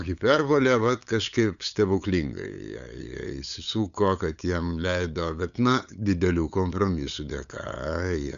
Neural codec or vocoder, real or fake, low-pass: codec, 16 kHz, 8 kbps, FunCodec, trained on LibriTTS, 25 frames a second; fake; 7.2 kHz